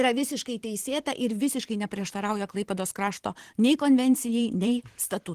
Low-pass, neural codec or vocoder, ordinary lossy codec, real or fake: 14.4 kHz; vocoder, 44.1 kHz, 128 mel bands every 512 samples, BigVGAN v2; Opus, 16 kbps; fake